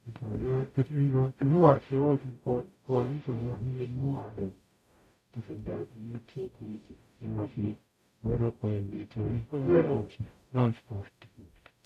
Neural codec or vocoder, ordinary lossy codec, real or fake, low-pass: codec, 44.1 kHz, 0.9 kbps, DAC; none; fake; 14.4 kHz